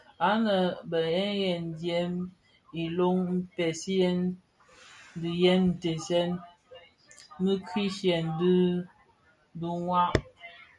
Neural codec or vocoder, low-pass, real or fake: none; 10.8 kHz; real